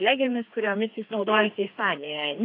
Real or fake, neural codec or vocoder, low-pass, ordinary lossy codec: fake; codec, 16 kHz, 2 kbps, FreqCodec, larger model; 5.4 kHz; AAC, 32 kbps